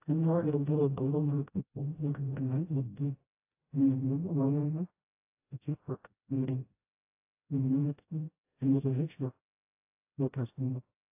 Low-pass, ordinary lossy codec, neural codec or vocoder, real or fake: 3.6 kHz; AAC, 24 kbps; codec, 16 kHz, 0.5 kbps, FreqCodec, smaller model; fake